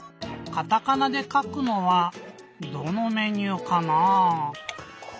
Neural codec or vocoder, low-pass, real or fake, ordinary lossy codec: none; none; real; none